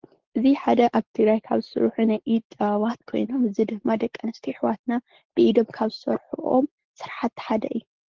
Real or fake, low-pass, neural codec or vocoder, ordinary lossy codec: real; 7.2 kHz; none; Opus, 16 kbps